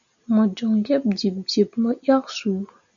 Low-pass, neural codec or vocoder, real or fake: 7.2 kHz; none; real